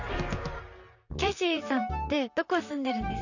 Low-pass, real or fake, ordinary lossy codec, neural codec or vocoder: 7.2 kHz; fake; none; vocoder, 44.1 kHz, 128 mel bands, Pupu-Vocoder